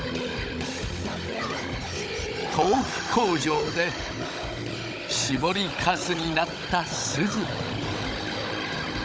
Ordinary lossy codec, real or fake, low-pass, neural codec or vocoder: none; fake; none; codec, 16 kHz, 16 kbps, FunCodec, trained on Chinese and English, 50 frames a second